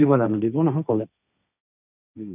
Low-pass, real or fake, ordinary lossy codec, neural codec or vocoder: 3.6 kHz; fake; none; codec, 16 kHz, 1.1 kbps, Voila-Tokenizer